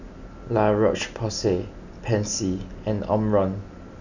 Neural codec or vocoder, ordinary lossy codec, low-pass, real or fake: none; none; 7.2 kHz; real